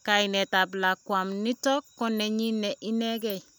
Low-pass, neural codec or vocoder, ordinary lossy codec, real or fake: none; none; none; real